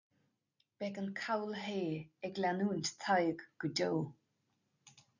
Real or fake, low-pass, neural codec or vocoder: real; 7.2 kHz; none